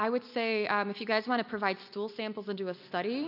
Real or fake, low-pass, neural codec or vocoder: real; 5.4 kHz; none